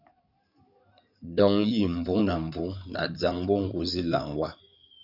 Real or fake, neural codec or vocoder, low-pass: fake; codec, 16 kHz in and 24 kHz out, 2.2 kbps, FireRedTTS-2 codec; 5.4 kHz